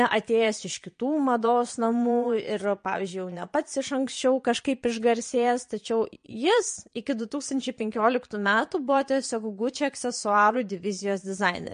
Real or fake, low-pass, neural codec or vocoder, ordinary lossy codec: fake; 9.9 kHz; vocoder, 22.05 kHz, 80 mel bands, Vocos; MP3, 48 kbps